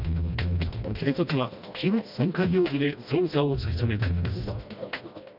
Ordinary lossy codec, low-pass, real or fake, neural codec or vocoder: none; 5.4 kHz; fake; codec, 16 kHz, 1 kbps, FreqCodec, smaller model